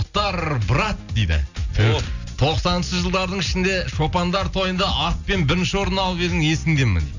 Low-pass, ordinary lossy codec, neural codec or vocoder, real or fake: 7.2 kHz; none; none; real